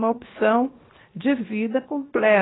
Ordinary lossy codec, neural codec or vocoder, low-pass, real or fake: AAC, 16 kbps; codec, 16 kHz, 0.7 kbps, FocalCodec; 7.2 kHz; fake